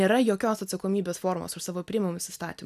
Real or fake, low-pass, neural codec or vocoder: real; 14.4 kHz; none